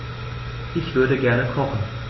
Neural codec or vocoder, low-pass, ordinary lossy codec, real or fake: none; 7.2 kHz; MP3, 24 kbps; real